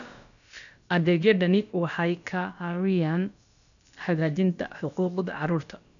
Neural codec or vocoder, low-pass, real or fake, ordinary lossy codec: codec, 16 kHz, about 1 kbps, DyCAST, with the encoder's durations; 7.2 kHz; fake; none